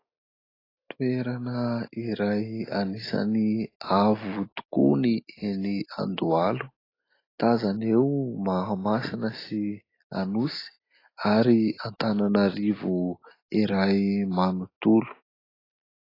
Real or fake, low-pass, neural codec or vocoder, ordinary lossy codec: real; 5.4 kHz; none; AAC, 24 kbps